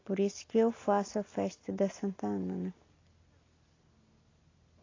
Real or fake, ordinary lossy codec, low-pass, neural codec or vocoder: real; AAC, 32 kbps; 7.2 kHz; none